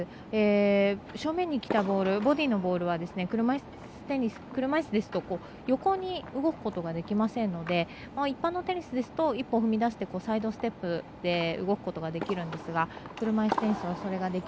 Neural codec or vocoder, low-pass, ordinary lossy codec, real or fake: none; none; none; real